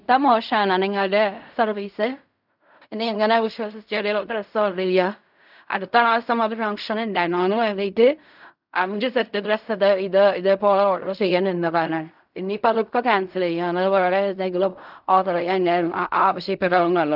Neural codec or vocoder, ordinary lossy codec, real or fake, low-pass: codec, 16 kHz in and 24 kHz out, 0.4 kbps, LongCat-Audio-Codec, fine tuned four codebook decoder; none; fake; 5.4 kHz